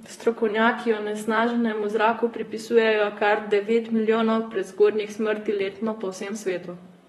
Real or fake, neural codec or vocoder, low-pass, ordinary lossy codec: fake; vocoder, 44.1 kHz, 128 mel bands, Pupu-Vocoder; 19.8 kHz; AAC, 32 kbps